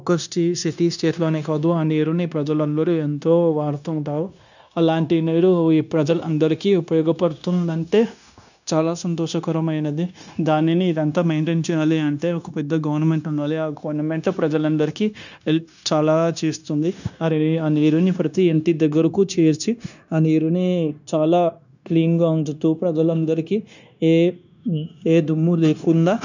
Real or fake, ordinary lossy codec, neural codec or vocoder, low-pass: fake; none; codec, 16 kHz, 0.9 kbps, LongCat-Audio-Codec; 7.2 kHz